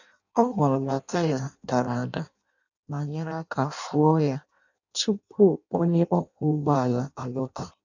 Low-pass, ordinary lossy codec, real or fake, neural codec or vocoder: 7.2 kHz; none; fake; codec, 16 kHz in and 24 kHz out, 0.6 kbps, FireRedTTS-2 codec